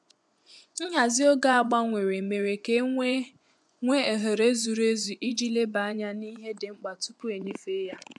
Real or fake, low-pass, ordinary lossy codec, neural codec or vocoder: fake; none; none; vocoder, 24 kHz, 100 mel bands, Vocos